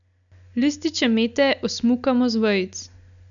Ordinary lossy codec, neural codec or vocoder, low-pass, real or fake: none; none; 7.2 kHz; real